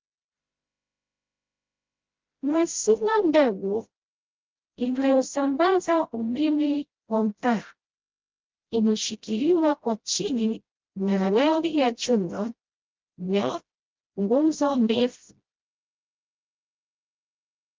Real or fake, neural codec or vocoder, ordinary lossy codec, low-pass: fake; codec, 16 kHz, 0.5 kbps, FreqCodec, smaller model; Opus, 32 kbps; 7.2 kHz